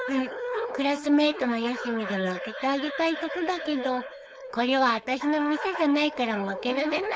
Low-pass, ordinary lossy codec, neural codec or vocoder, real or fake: none; none; codec, 16 kHz, 4.8 kbps, FACodec; fake